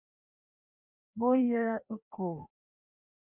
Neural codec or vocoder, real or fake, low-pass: codec, 16 kHz, 1 kbps, FreqCodec, larger model; fake; 3.6 kHz